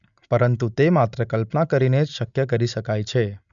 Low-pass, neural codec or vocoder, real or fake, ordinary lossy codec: 7.2 kHz; none; real; none